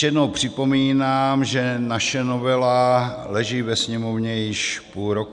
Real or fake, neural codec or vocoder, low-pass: real; none; 10.8 kHz